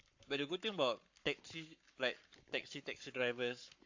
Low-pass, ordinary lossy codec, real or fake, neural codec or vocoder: 7.2 kHz; AAC, 48 kbps; fake; codec, 44.1 kHz, 7.8 kbps, Pupu-Codec